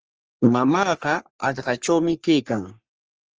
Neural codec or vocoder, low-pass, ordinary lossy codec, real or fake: codec, 44.1 kHz, 3.4 kbps, Pupu-Codec; 7.2 kHz; Opus, 24 kbps; fake